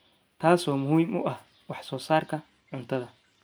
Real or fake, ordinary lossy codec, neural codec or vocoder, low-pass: real; none; none; none